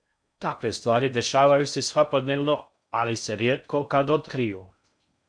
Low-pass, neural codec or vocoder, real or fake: 9.9 kHz; codec, 16 kHz in and 24 kHz out, 0.6 kbps, FocalCodec, streaming, 4096 codes; fake